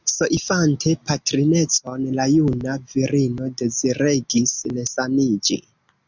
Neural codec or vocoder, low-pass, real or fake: none; 7.2 kHz; real